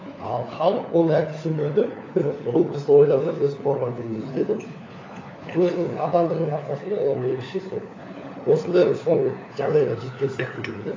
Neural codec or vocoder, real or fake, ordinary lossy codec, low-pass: codec, 16 kHz, 4 kbps, FunCodec, trained on LibriTTS, 50 frames a second; fake; AAC, 32 kbps; 7.2 kHz